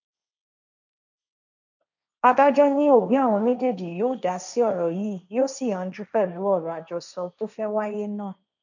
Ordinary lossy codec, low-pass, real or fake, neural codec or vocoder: none; 7.2 kHz; fake; codec, 16 kHz, 1.1 kbps, Voila-Tokenizer